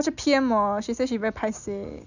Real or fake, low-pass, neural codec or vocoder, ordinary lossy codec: real; 7.2 kHz; none; none